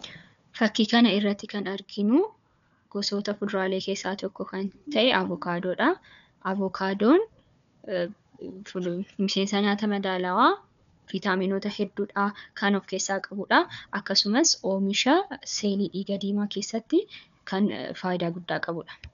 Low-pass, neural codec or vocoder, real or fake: 7.2 kHz; codec, 16 kHz, 4 kbps, FunCodec, trained on Chinese and English, 50 frames a second; fake